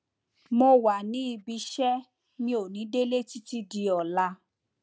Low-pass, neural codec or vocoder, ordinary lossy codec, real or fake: none; none; none; real